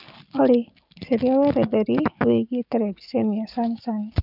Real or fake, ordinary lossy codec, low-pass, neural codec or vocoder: fake; none; 5.4 kHz; codec, 44.1 kHz, 7.8 kbps, DAC